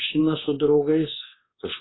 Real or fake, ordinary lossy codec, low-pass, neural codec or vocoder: fake; AAC, 16 kbps; 7.2 kHz; codec, 24 kHz, 1.2 kbps, DualCodec